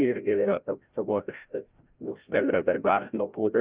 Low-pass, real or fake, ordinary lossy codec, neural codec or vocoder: 3.6 kHz; fake; Opus, 32 kbps; codec, 16 kHz, 0.5 kbps, FreqCodec, larger model